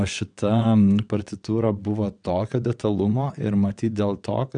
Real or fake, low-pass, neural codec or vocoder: fake; 9.9 kHz; vocoder, 22.05 kHz, 80 mel bands, WaveNeXt